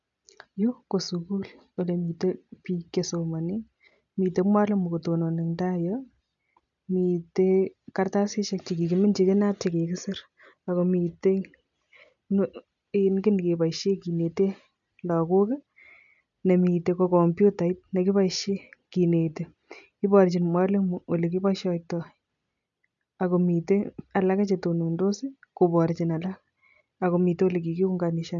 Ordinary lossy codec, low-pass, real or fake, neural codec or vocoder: none; 7.2 kHz; real; none